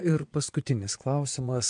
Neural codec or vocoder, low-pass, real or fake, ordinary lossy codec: vocoder, 22.05 kHz, 80 mel bands, Vocos; 9.9 kHz; fake; AAC, 64 kbps